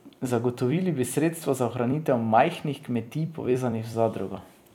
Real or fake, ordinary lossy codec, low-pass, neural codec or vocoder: fake; none; 19.8 kHz; vocoder, 44.1 kHz, 128 mel bands every 256 samples, BigVGAN v2